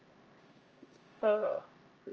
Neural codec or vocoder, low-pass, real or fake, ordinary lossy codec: codec, 16 kHz, 1 kbps, X-Codec, HuBERT features, trained on LibriSpeech; 7.2 kHz; fake; Opus, 24 kbps